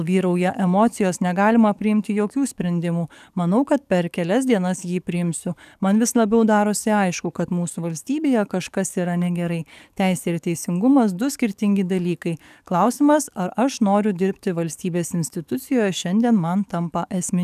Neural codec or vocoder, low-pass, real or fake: codec, 44.1 kHz, 7.8 kbps, DAC; 14.4 kHz; fake